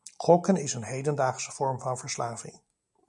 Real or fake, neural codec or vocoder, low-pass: real; none; 10.8 kHz